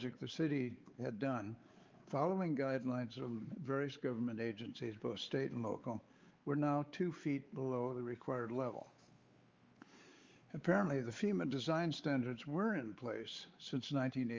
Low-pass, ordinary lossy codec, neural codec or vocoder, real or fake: 7.2 kHz; Opus, 32 kbps; codec, 16 kHz, 4 kbps, X-Codec, WavLM features, trained on Multilingual LibriSpeech; fake